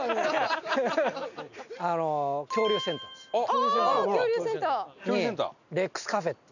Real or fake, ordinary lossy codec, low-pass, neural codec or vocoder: real; none; 7.2 kHz; none